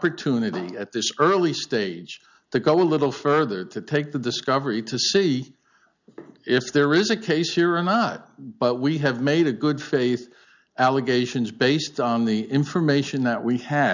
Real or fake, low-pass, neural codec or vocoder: real; 7.2 kHz; none